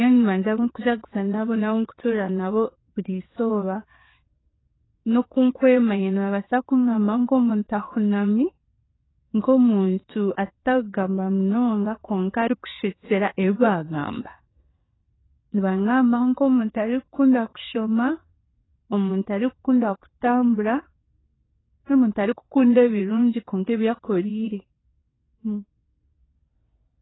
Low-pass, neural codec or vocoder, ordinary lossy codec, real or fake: 7.2 kHz; vocoder, 22.05 kHz, 80 mel bands, WaveNeXt; AAC, 16 kbps; fake